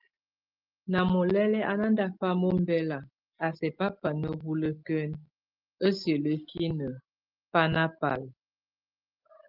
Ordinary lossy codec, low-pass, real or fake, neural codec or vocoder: Opus, 24 kbps; 5.4 kHz; real; none